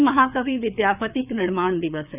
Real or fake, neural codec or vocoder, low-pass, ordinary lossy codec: fake; codec, 16 kHz, 4 kbps, FreqCodec, larger model; 3.6 kHz; none